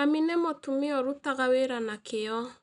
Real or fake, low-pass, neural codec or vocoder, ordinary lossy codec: real; 10.8 kHz; none; none